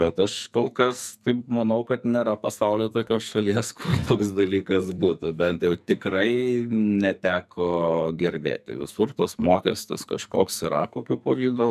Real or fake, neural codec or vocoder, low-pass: fake; codec, 44.1 kHz, 2.6 kbps, SNAC; 14.4 kHz